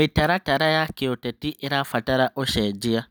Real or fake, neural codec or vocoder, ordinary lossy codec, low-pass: real; none; none; none